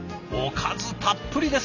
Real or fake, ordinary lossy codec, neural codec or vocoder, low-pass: real; none; none; 7.2 kHz